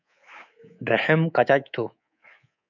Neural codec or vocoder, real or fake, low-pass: codec, 24 kHz, 3.1 kbps, DualCodec; fake; 7.2 kHz